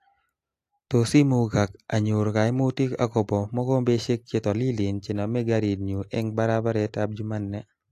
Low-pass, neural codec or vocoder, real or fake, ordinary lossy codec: 14.4 kHz; none; real; AAC, 64 kbps